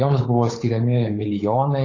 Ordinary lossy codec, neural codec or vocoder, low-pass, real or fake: AAC, 32 kbps; codec, 16 kHz, 8 kbps, FunCodec, trained on Chinese and English, 25 frames a second; 7.2 kHz; fake